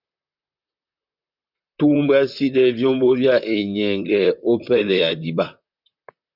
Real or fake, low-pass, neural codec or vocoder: fake; 5.4 kHz; vocoder, 44.1 kHz, 128 mel bands, Pupu-Vocoder